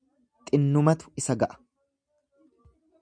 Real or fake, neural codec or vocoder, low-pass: real; none; 9.9 kHz